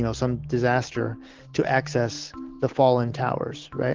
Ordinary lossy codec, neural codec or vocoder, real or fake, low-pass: Opus, 16 kbps; none; real; 7.2 kHz